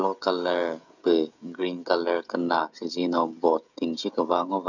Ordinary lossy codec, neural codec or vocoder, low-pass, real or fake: none; codec, 16 kHz, 16 kbps, FreqCodec, smaller model; 7.2 kHz; fake